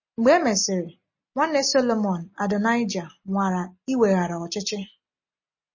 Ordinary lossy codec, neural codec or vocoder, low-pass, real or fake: MP3, 32 kbps; none; 7.2 kHz; real